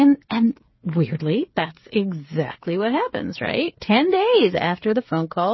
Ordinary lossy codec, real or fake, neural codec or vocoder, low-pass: MP3, 24 kbps; fake; codec, 16 kHz, 8 kbps, FreqCodec, smaller model; 7.2 kHz